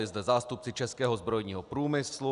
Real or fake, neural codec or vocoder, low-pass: real; none; 10.8 kHz